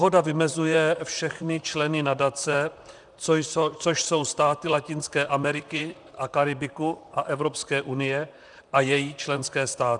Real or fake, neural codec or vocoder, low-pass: fake; vocoder, 44.1 kHz, 128 mel bands, Pupu-Vocoder; 10.8 kHz